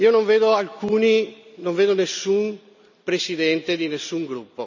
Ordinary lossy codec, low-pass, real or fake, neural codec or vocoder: none; 7.2 kHz; real; none